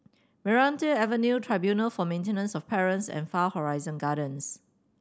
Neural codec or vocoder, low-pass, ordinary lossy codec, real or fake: none; none; none; real